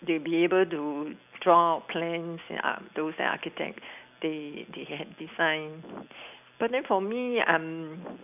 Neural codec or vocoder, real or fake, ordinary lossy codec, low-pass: none; real; none; 3.6 kHz